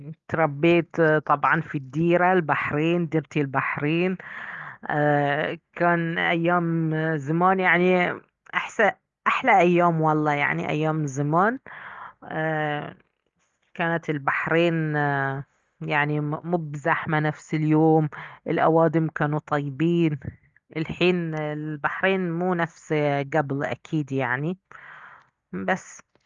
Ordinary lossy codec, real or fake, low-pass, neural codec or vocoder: Opus, 32 kbps; real; 7.2 kHz; none